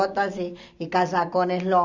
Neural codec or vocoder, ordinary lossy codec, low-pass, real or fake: none; Opus, 64 kbps; 7.2 kHz; real